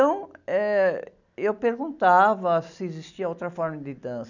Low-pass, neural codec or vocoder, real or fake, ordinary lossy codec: 7.2 kHz; none; real; none